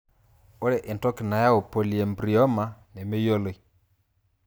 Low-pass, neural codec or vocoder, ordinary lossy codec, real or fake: none; none; none; real